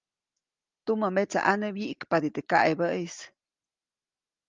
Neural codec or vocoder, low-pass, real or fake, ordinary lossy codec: none; 7.2 kHz; real; Opus, 32 kbps